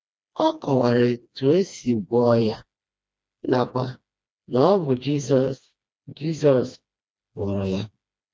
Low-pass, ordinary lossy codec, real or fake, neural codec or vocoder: none; none; fake; codec, 16 kHz, 2 kbps, FreqCodec, smaller model